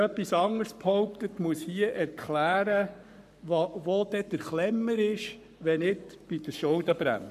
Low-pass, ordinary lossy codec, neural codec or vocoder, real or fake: 14.4 kHz; none; codec, 44.1 kHz, 7.8 kbps, Pupu-Codec; fake